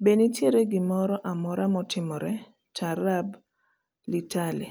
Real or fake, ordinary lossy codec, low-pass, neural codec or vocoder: real; none; none; none